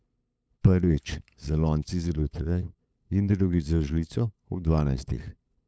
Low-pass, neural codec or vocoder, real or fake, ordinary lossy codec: none; codec, 16 kHz, 8 kbps, FunCodec, trained on LibriTTS, 25 frames a second; fake; none